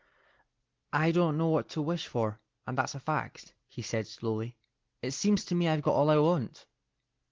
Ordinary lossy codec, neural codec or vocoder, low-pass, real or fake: Opus, 16 kbps; none; 7.2 kHz; real